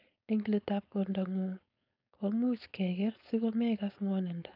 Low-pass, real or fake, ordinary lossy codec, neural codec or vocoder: 5.4 kHz; fake; none; codec, 16 kHz, 4.8 kbps, FACodec